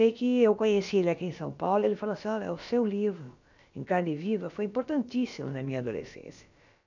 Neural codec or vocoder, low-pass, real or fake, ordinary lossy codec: codec, 16 kHz, about 1 kbps, DyCAST, with the encoder's durations; 7.2 kHz; fake; none